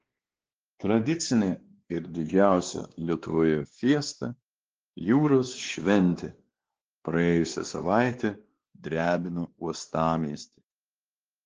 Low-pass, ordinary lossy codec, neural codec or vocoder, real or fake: 7.2 kHz; Opus, 16 kbps; codec, 16 kHz, 2 kbps, X-Codec, WavLM features, trained on Multilingual LibriSpeech; fake